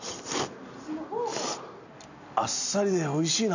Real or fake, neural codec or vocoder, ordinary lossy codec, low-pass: real; none; none; 7.2 kHz